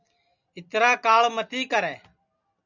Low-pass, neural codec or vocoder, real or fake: 7.2 kHz; none; real